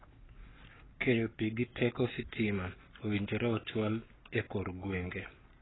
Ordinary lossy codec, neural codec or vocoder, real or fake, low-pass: AAC, 16 kbps; codec, 44.1 kHz, 7.8 kbps, Pupu-Codec; fake; 7.2 kHz